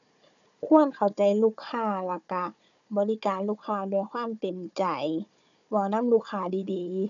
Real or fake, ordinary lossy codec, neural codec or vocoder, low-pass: fake; none; codec, 16 kHz, 4 kbps, FunCodec, trained on Chinese and English, 50 frames a second; 7.2 kHz